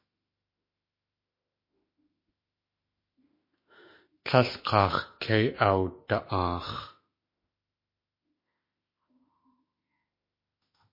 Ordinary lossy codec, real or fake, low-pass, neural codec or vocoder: MP3, 24 kbps; fake; 5.4 kHz; autoencoder, 48 kHz, 32 numbers a frame, DAC-VAE, trained on Japanese speech